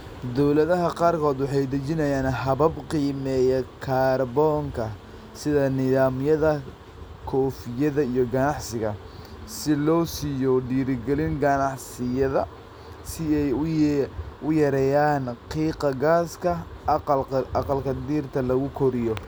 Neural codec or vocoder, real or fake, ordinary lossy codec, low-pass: none; real; none; none